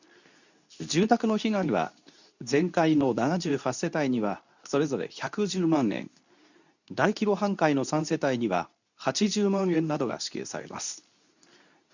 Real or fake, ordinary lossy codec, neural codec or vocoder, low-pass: fake; none; codec, 24 kHz, 0.9 kbps, WavTokenizer, medium speech release version 2; 7.2 kHz